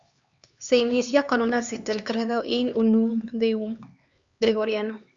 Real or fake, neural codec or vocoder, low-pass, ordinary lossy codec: fake; codec, 16 kHz, 2 kbps, X-Codec, HuBERT features, trained on LibriSpeech; 7.2 kHz; Opus, 64 kbps